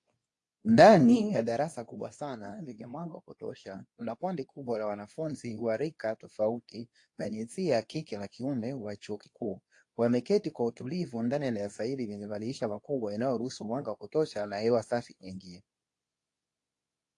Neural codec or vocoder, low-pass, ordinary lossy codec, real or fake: codec, 24 kHz, 0.9 kbps, WavTokenizer, medium speech release version 2; 10.8 kHz; AAC, 48 kbps; fake